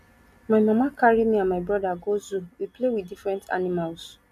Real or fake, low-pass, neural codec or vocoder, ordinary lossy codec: real; 14.4 kHz; none; MP3, 96 kbps